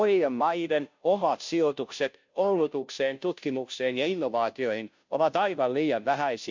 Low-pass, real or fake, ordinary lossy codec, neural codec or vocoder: 7.2 kHz; fake; MP3, 64 kbps; codec, 16 kHz, 0.5 kbps, FunCodec, trained on Chinese and English, 25 frames a second